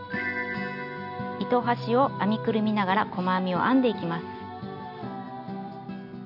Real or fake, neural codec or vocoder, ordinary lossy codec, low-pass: real; none; none; 5.4 kHz